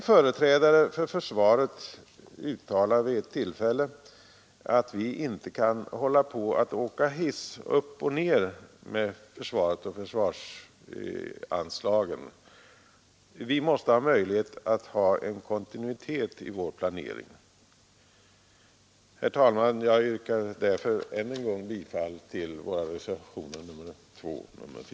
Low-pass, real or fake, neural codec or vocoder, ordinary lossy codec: none; real; none; none